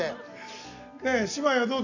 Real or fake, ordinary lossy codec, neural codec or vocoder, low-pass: real; none; none; 7.2 kHz